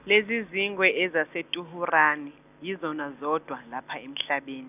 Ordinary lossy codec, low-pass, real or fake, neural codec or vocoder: none; 3.6 kHz; real; none